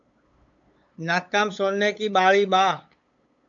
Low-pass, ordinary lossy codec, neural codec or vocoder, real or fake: 7.2 kHz; AAC, 64 kbps; codec, 16 kHz, 8 kbps, FunCodec, trained on LibriTTS, 25 frames a second; fake